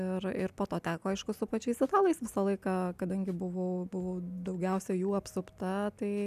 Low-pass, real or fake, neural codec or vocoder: 14.4 kHz; real; none